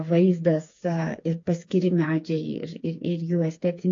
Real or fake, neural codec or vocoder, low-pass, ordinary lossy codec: fake; codec, 16 kHz, 4 kbps, FreqCodec, smaller model; 7.2 kHz; AAC, 48 kbps